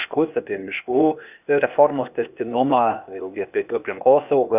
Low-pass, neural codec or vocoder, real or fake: 3.6 kHz; codec, 16 kHz, 0.8 kbps, ZipCodec; fake